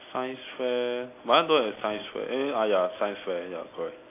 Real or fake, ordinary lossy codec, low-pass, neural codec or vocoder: real; AAC, 24 kbps; 3.6 kHz; none